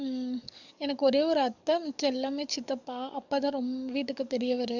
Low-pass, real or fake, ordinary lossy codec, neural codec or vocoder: 7.2 kHz; fake; none; codec, 44.1 kHz, 7.8 kbps, DAC